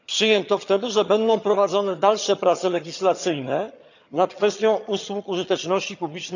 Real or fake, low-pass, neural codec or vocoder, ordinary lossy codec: fake; 7.2 kHz; vocoder, 22.05 kHz, 80 mel bands, HiFi-GAN; none